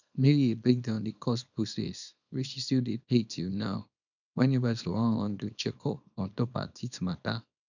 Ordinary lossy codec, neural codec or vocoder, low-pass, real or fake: none; codec, 24 kHz, 0.9 kbps, WavTokenizer, small release; 7.2 kHz; fake